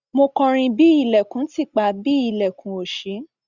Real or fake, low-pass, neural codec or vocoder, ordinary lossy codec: real; 7.2 kHz; none; Opus, 64 kbps